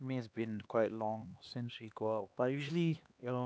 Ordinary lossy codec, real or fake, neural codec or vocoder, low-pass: none; fake; codec, 16 kHz, 2 kbps, X-Codec, HuBERT features, trained on LibriSpeech; none